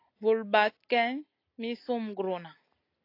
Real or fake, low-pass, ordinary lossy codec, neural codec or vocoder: real; 5.4 kHz; AAC, 32 kbps; none